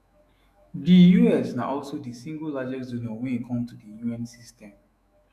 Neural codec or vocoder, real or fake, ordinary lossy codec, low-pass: autoencoder, 48 kHz, 128 numbers a frame, DAC-VAE, trained on Japanese speech; fake; none; 14.4 kHz